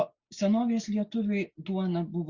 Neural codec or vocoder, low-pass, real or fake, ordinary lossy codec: none; 7.2 kHz; real; Opus, 64 kbps